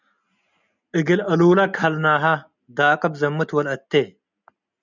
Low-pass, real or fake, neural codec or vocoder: 7.2 kHz; real; none